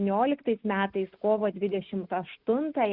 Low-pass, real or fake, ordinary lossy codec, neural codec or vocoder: 5.4 kHz; real; Opus, 64 kbps; none